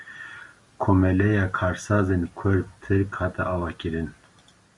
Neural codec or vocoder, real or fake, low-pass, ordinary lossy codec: none; real; 10.8 kHz; Opus, 64 kbps